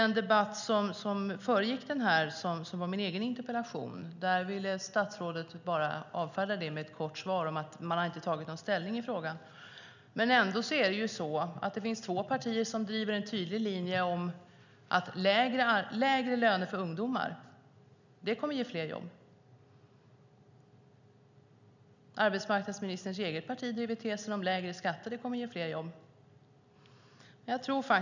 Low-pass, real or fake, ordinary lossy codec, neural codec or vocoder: 7.2 kHz; real; none; none